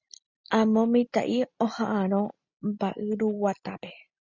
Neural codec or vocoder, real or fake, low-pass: none; real; 7.2 kHz